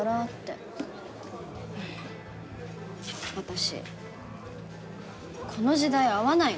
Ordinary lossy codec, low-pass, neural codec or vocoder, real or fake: none; none; none; real